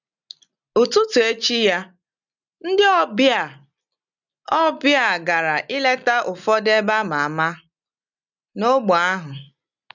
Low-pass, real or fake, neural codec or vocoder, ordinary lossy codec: 7.2 kHz; real; none; none